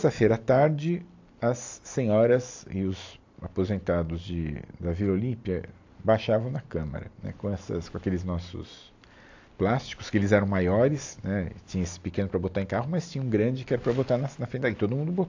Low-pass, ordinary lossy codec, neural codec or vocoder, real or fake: 7.2 kHz; AAC, 48 kbps; none; real